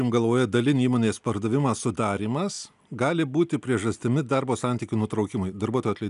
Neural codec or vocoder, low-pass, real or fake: none; 10.8 kHz; real